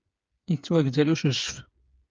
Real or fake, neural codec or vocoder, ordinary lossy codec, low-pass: fake; codec, 16 kHz, 8 kbps, FreqCodec, smaller model; Opus, 24 kbps; 7.2 kHz